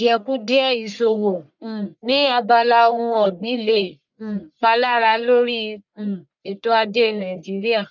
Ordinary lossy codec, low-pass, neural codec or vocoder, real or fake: none; 7.2 kHz; codec, 44.1 kHz, 1.7 kbps, Pupu-Codec; fake